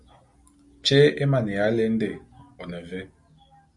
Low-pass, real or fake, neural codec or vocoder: 10.8 kHz; real; none